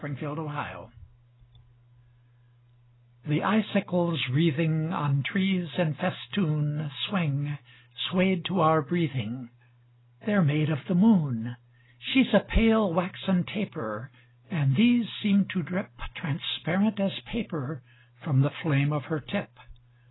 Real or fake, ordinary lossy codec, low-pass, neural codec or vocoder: real; AAC, 16 kbps; 7.2 kHz; none